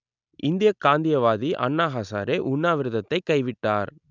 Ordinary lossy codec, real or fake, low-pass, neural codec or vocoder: none; real; 7.2 kHz; none